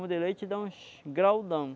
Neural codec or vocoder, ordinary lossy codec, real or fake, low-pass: none; none; real; none